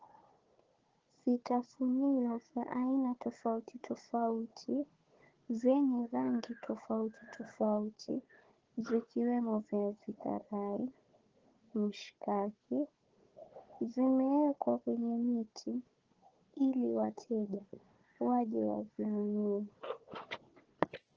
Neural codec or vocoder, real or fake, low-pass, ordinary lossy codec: codec, 16 kHz, 4 kbps, FunCodec, trained on Chinese and English, 50 frames a second; fake; 7.2 kHz; Opus, 16 kbps